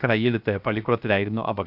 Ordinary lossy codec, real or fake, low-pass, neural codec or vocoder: none; fake; 5.4 kHz; codec, 16 kHz, 0.7 kbps, FocalCodec